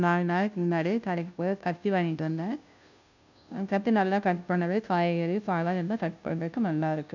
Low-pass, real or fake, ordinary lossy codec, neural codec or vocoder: 7.2 kHz; fake; none; codec, 16 kHz, 0.5 kbps, FunCodec, trained on Chinese and English, 25 frames a second